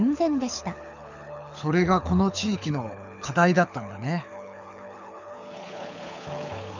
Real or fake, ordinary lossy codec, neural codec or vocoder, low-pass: fake; none; codec, 24 kHz, 6 kbps, HILCodec; 7.2 kHz